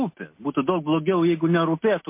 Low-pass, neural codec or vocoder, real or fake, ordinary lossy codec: 3.6 kHz; none; real; MP3, 24 kbps